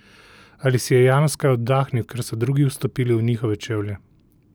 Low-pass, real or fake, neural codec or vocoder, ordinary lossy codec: none; real; none; none